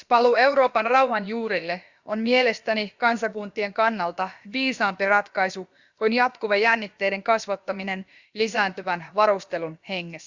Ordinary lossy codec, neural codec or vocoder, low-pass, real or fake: Opus, 64 kbps; codec, 16 kHz, about 1 kbps, DyCAST, with the encoder's durations; 7.2 kHz; fake